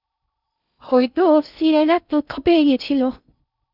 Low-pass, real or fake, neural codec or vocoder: 5.4 kHz; fake; codec, 16 kHz in and 24 kHz out, 0.6 kbps, FocalCodec, streaming, 2048 codes